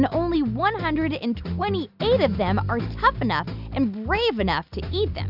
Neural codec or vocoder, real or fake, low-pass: none; real; 5.4 kHz